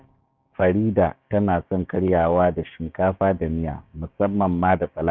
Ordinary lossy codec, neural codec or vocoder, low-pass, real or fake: none; codec, 16 kHz, 6 kbps, DAC; none; fake